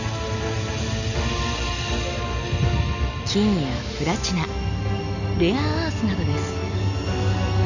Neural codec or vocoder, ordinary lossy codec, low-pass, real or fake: none; Opus, 64 kbps; 7.2 kHz; real